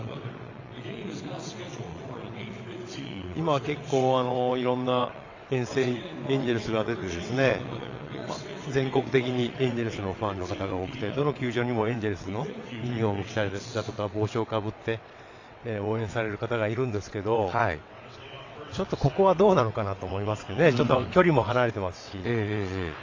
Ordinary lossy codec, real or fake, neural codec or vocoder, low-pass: AAC, 48 kbps; fake; vocoder, 22.05 kHz, 80 mel bands, WaveNeXt; 7.2 kHz